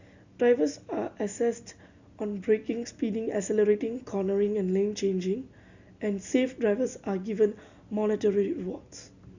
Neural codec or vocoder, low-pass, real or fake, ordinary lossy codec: none; 7.2 kHz; real; Opus, 64 kbps